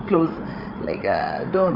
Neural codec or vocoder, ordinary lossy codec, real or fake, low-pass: codec, 16 kHz, 8 kbps, FreqCodec, larger model; none; fake; 5.4 kHz